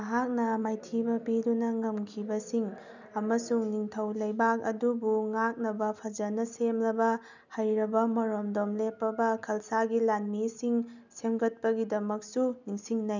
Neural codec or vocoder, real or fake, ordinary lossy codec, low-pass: none; real; none; 7.2 kHz